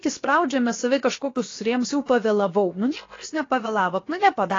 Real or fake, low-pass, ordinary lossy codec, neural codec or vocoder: fake; 7.2 kHz; AAC, 32 kbps; codec, 16 kHz, 0.7 kbps, FocalCodec